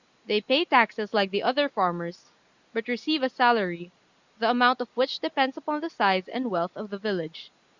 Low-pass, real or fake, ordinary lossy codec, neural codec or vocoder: 7.2 kHz; real; Opus, 64 kbps; none